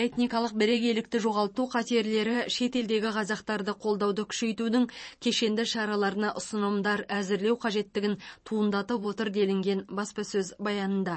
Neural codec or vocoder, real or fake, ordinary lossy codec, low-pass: vocoder, 44.1 kHz, 128 mel bands every 512 samples, BigVGAN v2; fake; MP3, 32 kbps; 9.9 kHz